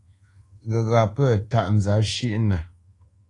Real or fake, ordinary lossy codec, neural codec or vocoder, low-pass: fake; AAC, 32 kbps; codec, 24 kHz, 1.2 kbps, DualCodec; 10.8 kHz